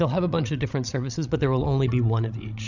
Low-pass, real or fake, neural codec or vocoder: 7.2 kHz; fake; codec, 16 kHz, 16 kbps, FreqCodec, larger model